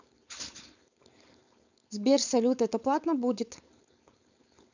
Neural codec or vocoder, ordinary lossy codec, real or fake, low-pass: codec, 16 kHz, 4.8 kbps, FACodec; none; fake; 7.2 kHz